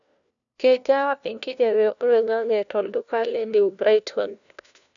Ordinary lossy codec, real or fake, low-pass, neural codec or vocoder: none; fake; 7.2 kHz; codec, 16 kHz, 1 kbps, FunCodec, trained on LibriTTS, 50 frames a second